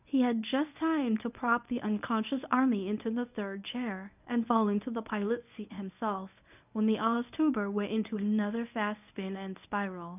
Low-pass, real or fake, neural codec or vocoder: 3.6 kHz; fake; codec, 24 kHz, 0.9 kbps, WavTokenizer, medium speech release version 1